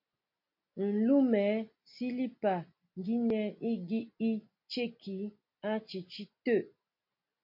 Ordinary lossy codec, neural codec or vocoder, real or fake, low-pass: MP3, 32 kbps; none; real; 5.4 kHz